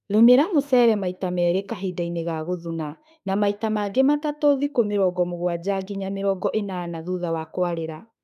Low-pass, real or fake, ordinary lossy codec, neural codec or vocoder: 14.4 kHz; fake; none; autoencoder, 48 kHz, 32 numbers a frame, DAC-VAE, trained on Japanese speech